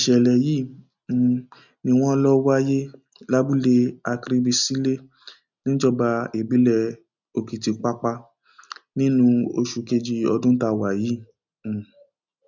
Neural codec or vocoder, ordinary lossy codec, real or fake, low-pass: none; none; real; 7.2 kHz